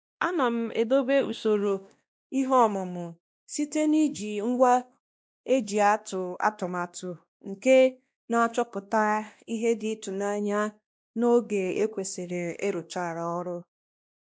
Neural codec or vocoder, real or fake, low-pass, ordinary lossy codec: codec, 16 kHz, 1 kbps, X-Codec, WavLM features, trained on Multilingual LibriSpeech; fake; none; none